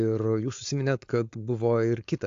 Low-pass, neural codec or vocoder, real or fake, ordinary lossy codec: 7.2 kHz; codec, 16 kHz, 4.8 kbps, FACodec; fake; AAC, 48 kbps